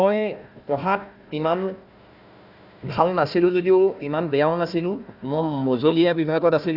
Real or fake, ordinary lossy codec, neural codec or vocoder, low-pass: fake; none; codec, 16 kHz, 1 kbps, FunCodec, trained on Chinese and English, 50 frames a second; 5.4 kHz